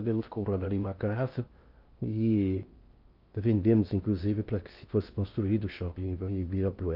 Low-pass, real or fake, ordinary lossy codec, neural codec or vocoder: 5.4 kHz; fake; Opus, 24 kbps; codec, 16 kHz in and 24 kHz out, 0.6 kbps, FocalCodec, streaming, 2048 codes